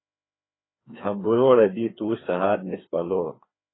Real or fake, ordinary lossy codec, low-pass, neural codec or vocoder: fake; AAC, 16 kbps; 7.2 kHz; codec, 16 kHz, 2 kbps, FreqCodec, larger model